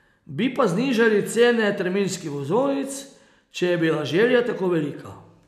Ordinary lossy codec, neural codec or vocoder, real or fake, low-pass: none; none; real; 14.4 kHz